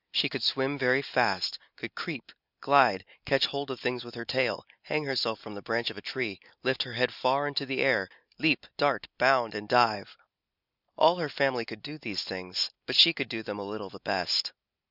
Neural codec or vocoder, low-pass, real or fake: none; 5.4 kHz; real